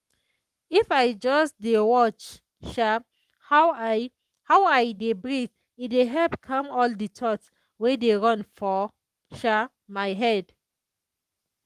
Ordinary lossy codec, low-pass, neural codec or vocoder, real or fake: Opus, 32 kbps; 14.4 kHz; codec, 44.1 kHz, 7.8 kbps, Pupu-Codec; fake